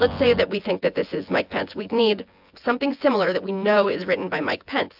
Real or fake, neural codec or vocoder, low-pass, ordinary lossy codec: fake; vocoder, 24 kHz, 100 mel bands, Vocos; 5.4 kHz; AAC, 48 kbps